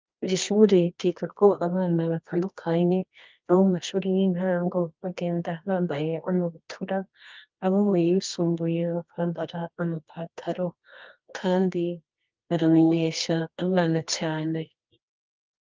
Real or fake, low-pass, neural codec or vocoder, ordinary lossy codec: fake; 7.2 kHz; codec, 24 kHz, 0.9 kbps, WavTokenizer, medium music audio release; Opus, 32 kbps